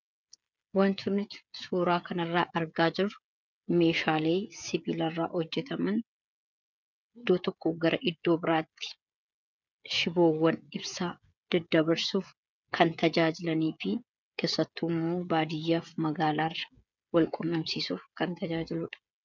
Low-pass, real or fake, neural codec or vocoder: 7.2 kHz; fake; codec, 16 kHz, 16 kbps, FreqCodec, smaller model